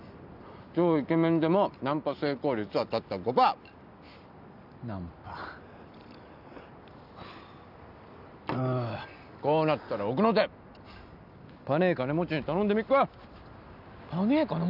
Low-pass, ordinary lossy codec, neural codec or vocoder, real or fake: 5.4 kHz; none; none; real